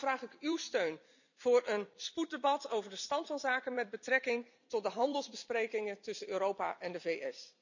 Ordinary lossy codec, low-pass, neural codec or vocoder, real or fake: none; 7.2 kHz; vocoder, 44.1 kHz, 128 mel bands every 256 samples, BigVGAN v2; fake